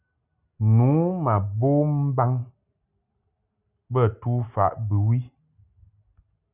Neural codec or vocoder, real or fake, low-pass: none; real; 3.6 kHz